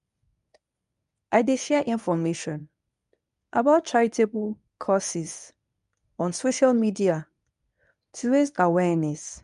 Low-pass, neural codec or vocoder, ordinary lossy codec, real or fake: 10.8 kHz; codec, 24 kHz, 0.9 kbps, WavTokenizer, medium speech release version 1; none; fake